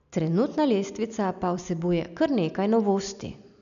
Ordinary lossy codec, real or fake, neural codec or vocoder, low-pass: none; real; none; 7.2 kHz